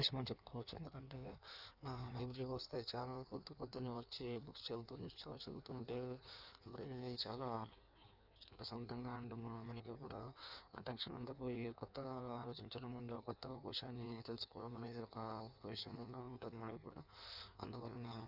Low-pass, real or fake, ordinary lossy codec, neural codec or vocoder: 5.4 kHz; fake; none; codec, 16 kHz in and 24 kHz out, 1.1 kbps, FireRedTTS-2 codec